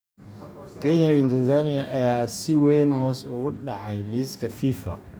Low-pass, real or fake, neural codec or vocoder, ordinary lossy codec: none; fake; codec, 44.1 kHz, 2.6 kbps, DAC; none